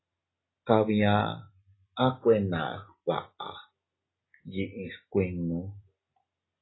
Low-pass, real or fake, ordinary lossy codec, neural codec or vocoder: 7.2 kHz; real; AAC, 16 kbps; none